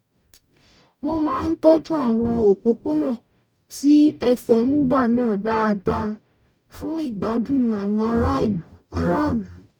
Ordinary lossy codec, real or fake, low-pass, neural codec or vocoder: none; fake; 19.8 kHz; codec, 44.1 kHz, 0.9 kbps, DAC